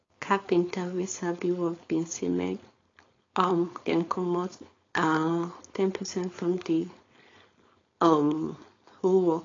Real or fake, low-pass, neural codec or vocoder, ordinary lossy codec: fake; 7.2 kHz; codec, 16 kHz, 4.8 kbps, FACodec; AAC, 32 kbps